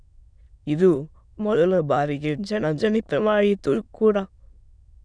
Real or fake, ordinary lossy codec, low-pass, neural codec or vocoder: fake; none; 9.9 kHz; autoencoder, 22.05 kHz, a latent of 192 numbers a frame, VITS, trained on many speakers